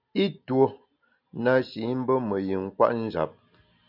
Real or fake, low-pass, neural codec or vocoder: real; 5.4 kHz; none